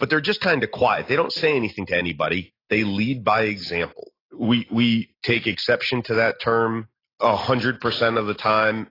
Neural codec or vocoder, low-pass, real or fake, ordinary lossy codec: none; 5.4 kHz; real; AAC, 24 kbps